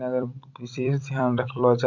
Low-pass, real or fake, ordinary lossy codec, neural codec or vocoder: 7.2 kHz; fake; none; vocoder, 44.1 kHz, 128 mel bands every 256 samples, BigVGAN v2